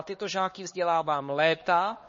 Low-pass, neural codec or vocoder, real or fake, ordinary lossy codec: 7.2 kHz; codec, 16 kHz, 2 kbps, X-Codec, HuBERT features, trained on LibriSpeech; fake; MP3, 32 kbps